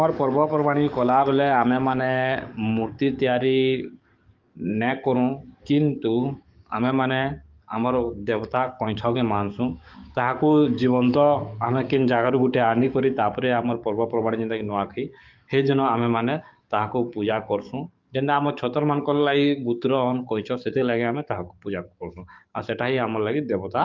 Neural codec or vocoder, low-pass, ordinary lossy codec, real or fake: codec, 44.1 kHz, 7.8 kbps, DAC; 7.2 kHz; Opus, 24 kbps; fake